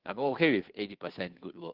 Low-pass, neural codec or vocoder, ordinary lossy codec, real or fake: 5.4 kHz; codec, 16 kHz, 2 kbps, FunCodec, trained on Chinese and English, 25 frames a second; Opus, 16 kbps; fake